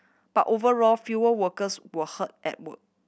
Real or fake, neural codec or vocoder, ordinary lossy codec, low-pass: real; none; none; none